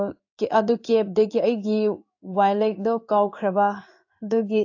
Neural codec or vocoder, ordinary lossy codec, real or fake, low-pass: codec, 16 kHz in and 24 kHz out, 1 kbps, XY-Tokenizer; AAC, 48 kbps; fake; 7.2 kHz